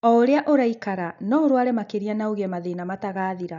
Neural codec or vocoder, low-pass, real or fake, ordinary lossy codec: none; 7.2 kHz; real; none